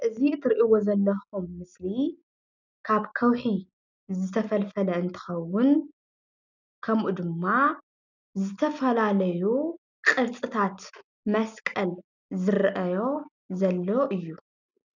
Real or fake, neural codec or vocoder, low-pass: real; none; 7.2 kHz